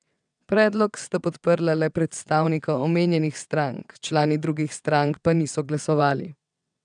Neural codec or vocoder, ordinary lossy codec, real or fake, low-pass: vocoder, 22.05 kHz, 80 mel bands, WaveNeXt; none; fake; 9.9 kHz